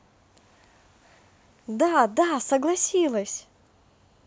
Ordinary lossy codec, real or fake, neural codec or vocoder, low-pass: none; real; none; none